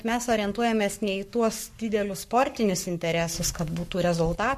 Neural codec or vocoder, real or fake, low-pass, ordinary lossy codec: codec, 44.1 kHz, 7.8 kbps, Pupu-Codec; fake; 14.4 kHz; MP3, 64 kbps